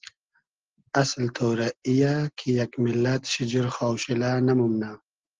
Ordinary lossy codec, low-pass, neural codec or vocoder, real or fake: Opus, 16 kbps; 7.2 kHz; none; real